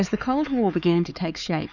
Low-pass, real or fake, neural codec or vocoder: 7.2 kHz; fake; codec, 16 kHz, 4 kbps, X-Codec, HuBERT features, trained on LibriSpeech